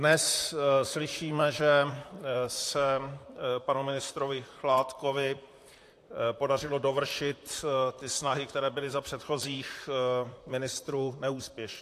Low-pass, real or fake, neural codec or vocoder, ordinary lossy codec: 14.4 kHz; fake; vocoder, 44.1 kHz, 128 mel bands, Pupu-Vocoder; AAC, 64 kbps